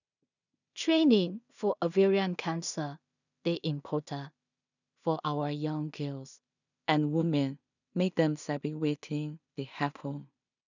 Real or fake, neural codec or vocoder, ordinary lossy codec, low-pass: fake; codec, 16 kHz in and 24 kHz out, 0.4 kbps, LongCat-Audio-Codec, two codebook decoder; none; 7.2 kHz